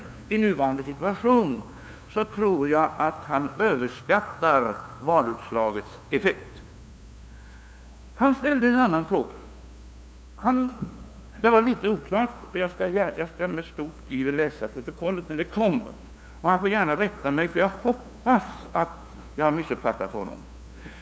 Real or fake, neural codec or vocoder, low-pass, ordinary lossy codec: fake; codec, 16 kHz, 2 kbps, FunCodec, trained on LibriTTS, 25 frames a second; none; none